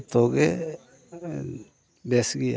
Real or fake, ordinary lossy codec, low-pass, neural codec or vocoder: real; none; none; none